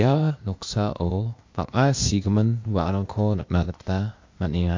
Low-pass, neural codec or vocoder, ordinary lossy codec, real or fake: 7.2 kHz; codec, 16 kHz, 0.8 kbps, ZipCodec; MP3, 48 kbps; fake